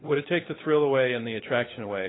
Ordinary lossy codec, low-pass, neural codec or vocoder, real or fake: AAC, 16 kbps; 7.2 kHz; codec, 16 kHz, 16 kbps, FunCodec, trained on LibriTTS, 50 frames a second; fake